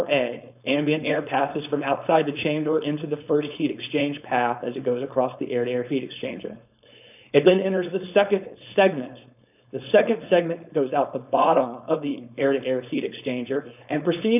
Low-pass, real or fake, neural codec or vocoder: 3.6 kHz; fake; codec, 16 kHz, 4.8 kbps, FACodec